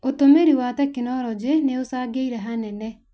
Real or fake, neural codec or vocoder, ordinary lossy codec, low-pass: real; none; none; none